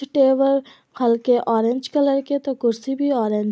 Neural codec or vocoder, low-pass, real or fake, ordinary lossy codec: none; none; real; none